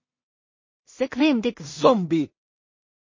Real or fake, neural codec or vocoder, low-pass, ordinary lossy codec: fake; codec, 16 kHz in and 24 kHz out, 0.4 kbps, LongCat-Audio-Codec, two codebook decoder; 7.2 kHz; MP3, 32 kbps